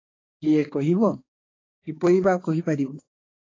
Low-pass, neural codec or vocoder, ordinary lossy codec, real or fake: 7.2 kHz; codec, 16 kHz, 4 kbps, X-Codec, HuBERT features, trained on balanced general audio; AAC, 32 kbps; fake